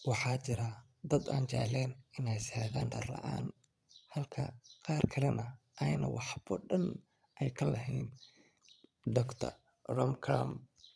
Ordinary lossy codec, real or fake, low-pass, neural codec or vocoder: none; fake; none; vocoder, 22.05 kHz, 80 mel bands, Vocos